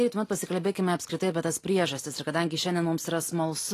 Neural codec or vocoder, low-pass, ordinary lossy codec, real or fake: none; 14.4 kHz; AAC, 48 kbps; real